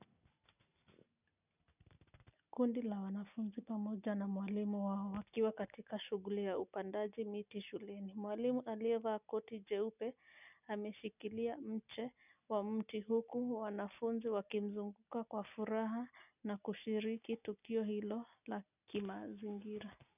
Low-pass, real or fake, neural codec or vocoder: 3.6 kHz; real; none